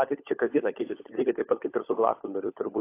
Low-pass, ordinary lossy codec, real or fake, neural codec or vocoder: 3.6 kHz; AAC, 24 kbps; fake; codec, 16 kHz, 16 kbps, FunCodec, trained on LibriTTS, 50 frames a second